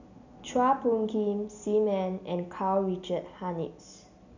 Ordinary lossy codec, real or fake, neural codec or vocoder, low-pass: none; real; none; 7.2 kHz